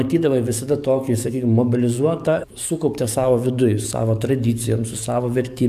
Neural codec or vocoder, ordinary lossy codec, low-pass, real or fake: codec, 44.1 kHz, 7.8 kbps, DAC; AAC, 96 kbps; 14.4 kHz; fake